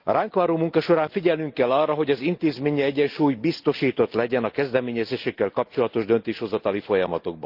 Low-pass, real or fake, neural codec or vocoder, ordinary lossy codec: 5.4 kHz; real; none; Opus, 32 kbps